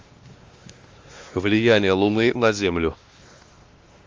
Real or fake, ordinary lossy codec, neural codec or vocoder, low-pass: fake; Opus, 32 kbps; codec, 16 kHz, 2 kbps, X-Codec, WavLM features, trained on Multilingual LibriSpeech; 7.2 kHz